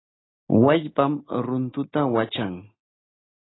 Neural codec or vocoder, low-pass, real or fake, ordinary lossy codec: none; 7.2 kHz; real; AAC, 16 kbps